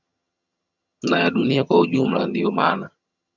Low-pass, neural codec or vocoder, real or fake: 7.2 kHz; vocoder, 22.05 kHz, 80 mel bands, HiFi-GAN; fake